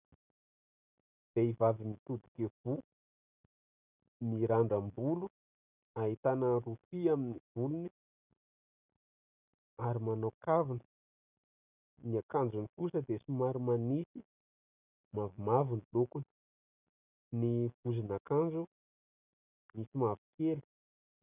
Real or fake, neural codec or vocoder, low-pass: real; none; 3.6 kHz